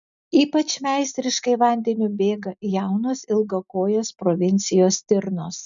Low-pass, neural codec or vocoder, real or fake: 7.2 kHz; none; real